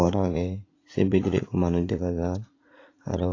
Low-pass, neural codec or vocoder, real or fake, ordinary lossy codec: 7.2 kHz; vocoder, 44.1 kHz, 80 mel bands, Vocos; fake; AAC, 32 kbps